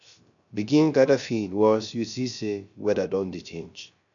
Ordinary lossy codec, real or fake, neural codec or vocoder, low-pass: none; fake; codec, 16 kHz, 0.3 kbps, FocalCodec; 7.2 kHz